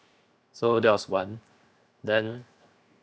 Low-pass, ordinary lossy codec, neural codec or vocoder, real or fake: none; none; codec, 16 kHz, 0.7 kbps, FocalCodec; fake